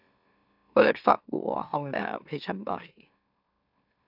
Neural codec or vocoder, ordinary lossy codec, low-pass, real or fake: autoencoder, 44.1 kHz, a latent of 192 numbers a frame, MeloTTS; none; 5.4 kHz; fake